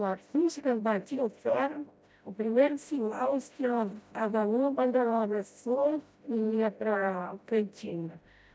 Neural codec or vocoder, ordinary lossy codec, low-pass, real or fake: codec, 16 kHz, 0.5 kbps, FreqCodec, smaller model; none; none; fake